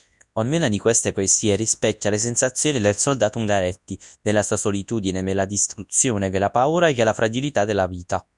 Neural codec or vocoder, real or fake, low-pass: codec, 24 kHz, 0.9 kbps, WavTokenizer, large speech release; fake; 10.8 kHz